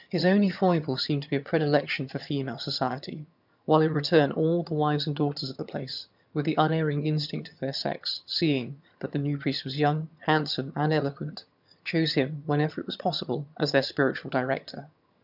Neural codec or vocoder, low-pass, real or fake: vocoder, 22.05 kHz, 80 mel bands, HiFi-GAN; 5.4 kHz; fake